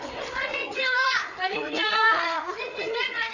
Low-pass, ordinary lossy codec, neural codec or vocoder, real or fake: 7.2 kHz; none; codec, 16 kHz, 4 kbps, FreqCodec, larger model; fake